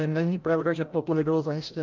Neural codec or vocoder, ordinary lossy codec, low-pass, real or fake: codec, 16 kHz, 0.5 kbps, FreqCodec, larger model; Opus, 24 kbps; 7.2 kHz; fake